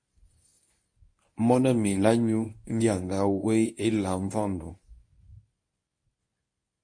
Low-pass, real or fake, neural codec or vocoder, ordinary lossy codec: 9.9 kHz; fake; codec, 24 kHz, 0.9 kbps, WavTokenizer, medium speech release version 1; MP3, 48 kbps